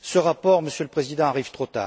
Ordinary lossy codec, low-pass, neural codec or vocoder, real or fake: none; none; none; real